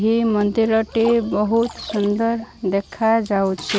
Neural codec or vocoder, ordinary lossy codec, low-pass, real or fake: none; none; none; real